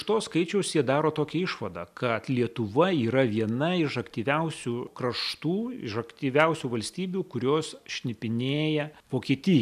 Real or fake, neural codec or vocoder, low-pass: real; none; 14.4 kHz